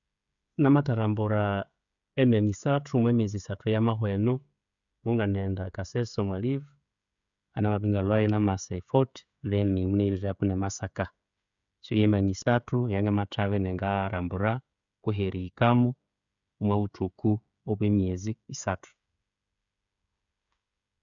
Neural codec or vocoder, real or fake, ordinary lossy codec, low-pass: codec, 16 kHz, 16 kbps, FreqCodec, smaller model; fake; none; 7.2 kHz